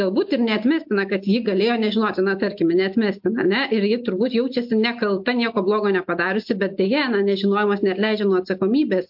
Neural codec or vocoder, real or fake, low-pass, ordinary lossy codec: none; real; 5.4 kHz; MP3, 48 kbps